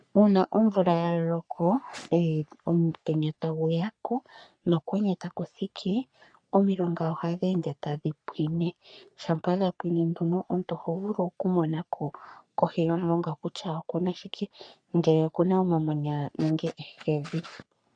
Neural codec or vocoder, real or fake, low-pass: codec, 44.1 kHz, 3.4 kbps, Pupu-Codec; fake; 9.9 kHz